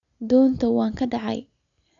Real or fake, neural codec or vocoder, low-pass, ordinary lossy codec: real; none; 7.2 kHz; none